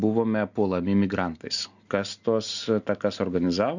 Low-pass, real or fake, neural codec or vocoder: 7.2 kHz; real; none